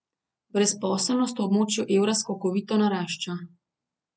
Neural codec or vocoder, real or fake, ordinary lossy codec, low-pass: none; real; none; none